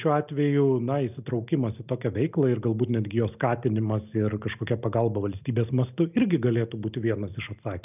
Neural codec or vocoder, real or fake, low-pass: none; real; 3.6 kHz